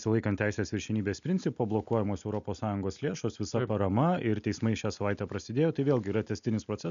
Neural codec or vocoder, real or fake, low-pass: none; real; 7.2 kHz